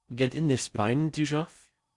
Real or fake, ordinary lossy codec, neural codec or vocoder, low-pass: fake; Opus, 64 kbps; codec, 16 kHz in and 24 kHz out, 0.6 kbps, FocalCodec, streaming, 4096 codes; 10.8 kHz